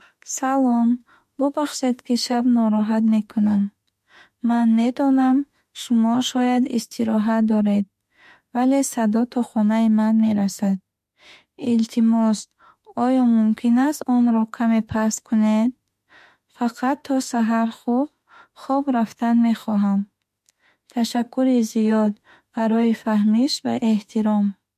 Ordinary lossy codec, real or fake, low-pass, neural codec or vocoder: MP3, 64 kbps; fake; 14.4 kHz; autoencoder, 48 kHz, 32 numbers a frame, DAC-VAE, trained on Japanese speech